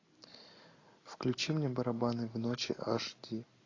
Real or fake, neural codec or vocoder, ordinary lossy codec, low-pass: real; none; AAC, 32 kbps; 7.2 kHz